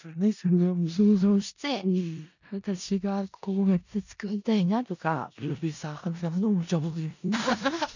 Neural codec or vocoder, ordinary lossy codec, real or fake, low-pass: codec, 16 kHz in and 24 kHz out, 0.4 kbps, LongCat-Audio-Codec, four codebook decoder; none; fake; 7.2 kHz